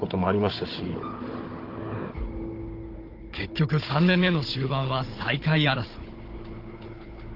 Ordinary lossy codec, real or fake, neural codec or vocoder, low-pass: Opus, 16 kbps; fake; codec, 16 kHz, 16 kbps, FunCodec, trained on Chinese and English, 50 frames a second; 5.4 kHz